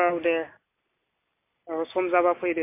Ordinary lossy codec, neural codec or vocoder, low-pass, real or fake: MP3, 24 kbps; none; 3.6 kHz; real